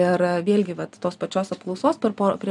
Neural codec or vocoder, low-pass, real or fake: none; 10.8 kHz; real